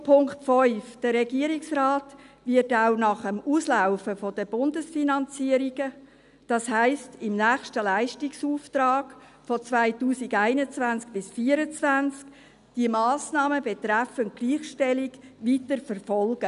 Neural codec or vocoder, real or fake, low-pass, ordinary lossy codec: none; real; 10.8 kHz; none